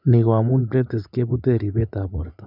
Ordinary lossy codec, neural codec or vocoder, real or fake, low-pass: none; vocoder, 24 kHz, 100 mel bands, Vocos; fake; 5.4 kHz